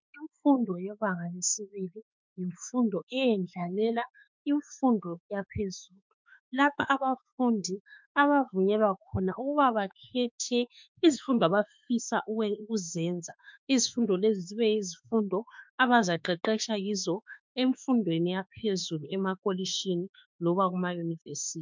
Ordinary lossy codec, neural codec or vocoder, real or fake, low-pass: MP3, 64 kbps; autoencoder, 48 kHz, 32 numbers a frame, DAC-VAE, trained on Japanese speech; fake; 7.2 kHz